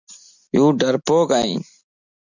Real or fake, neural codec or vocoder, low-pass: real; none; 7.2 kHz